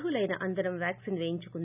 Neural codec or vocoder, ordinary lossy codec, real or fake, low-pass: none; none; real; 3.6 kHz